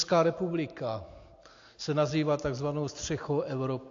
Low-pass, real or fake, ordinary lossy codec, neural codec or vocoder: 7.2 kHz; real; AAC, 48 kbps; none